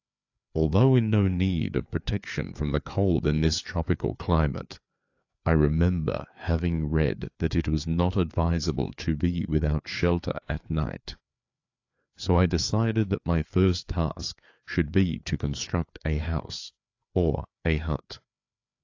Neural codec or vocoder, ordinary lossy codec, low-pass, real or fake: codec, 16 kHz, 4 kbps, FreqCodec, larger model; AAC, 48 kbps; 7.2 kHz; fake